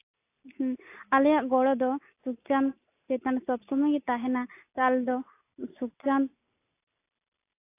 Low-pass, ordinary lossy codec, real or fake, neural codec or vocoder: 3.6 kHz; AAC, 24 kbps; real; none